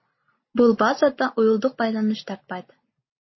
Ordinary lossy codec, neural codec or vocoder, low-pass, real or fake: MP3, 24 kbps; none; 7.2 kHz; real